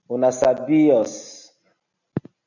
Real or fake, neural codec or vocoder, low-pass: real; none; 7.2 kHz